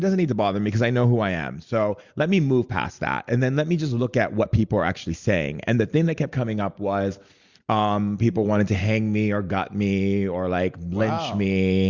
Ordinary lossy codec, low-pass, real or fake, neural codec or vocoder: Opus, 64 kbps; 7.2 kHz; real; none